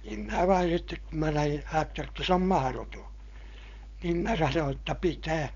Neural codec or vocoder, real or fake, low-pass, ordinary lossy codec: codec, 16 kHz, 4.8 kbps, FACodec; fake; 7.2 kHz; none